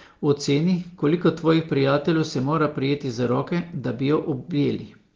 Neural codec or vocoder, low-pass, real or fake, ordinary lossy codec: none; 7.2 kHz; real; Opus, 16 kbps